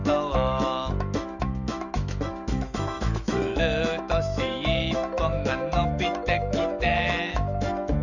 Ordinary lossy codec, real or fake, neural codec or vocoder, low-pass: none; fake; autoencoder, 48 kHz, 128 numbers a frame, DAC-VAE, trained on Japanese speech; 7.2 kHz